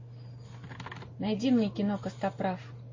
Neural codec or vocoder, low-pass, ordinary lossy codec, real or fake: none; 7.2 kHz; MP3, 32 kbps; real